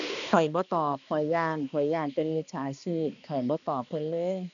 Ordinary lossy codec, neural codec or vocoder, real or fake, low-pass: none; codec, 16 kHz, 2 kbps, X-Codec, HuBERT features, trained on balanced general audio; fake; 7.2 kHz